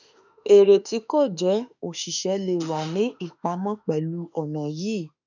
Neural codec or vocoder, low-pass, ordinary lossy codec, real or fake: autoencoder, 48 kHz, 32 numbers a frame, DAC-VAE, trained on Japanese speech; 7.2 kHz; none; fake